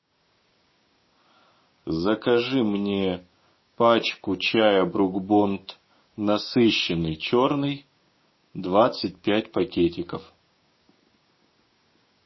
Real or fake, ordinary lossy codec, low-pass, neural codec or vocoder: fake; MP3, 24 kbps; 7.2 kHz; codec, 16 kHz, 6 kbps, DAC